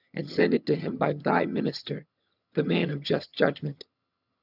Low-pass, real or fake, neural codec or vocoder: 5.4 kHz; fake; vocoder, 22.05 kHz, 80 mel bands, HiFi-GAN